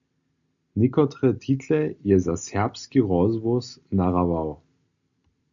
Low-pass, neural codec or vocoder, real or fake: 7.2 kHz; none; real